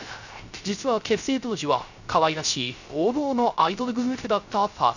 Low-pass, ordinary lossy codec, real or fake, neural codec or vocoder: 7.2 kHz; none; fake; codec, 16 kHz, 0.3 kbps, FocalCodec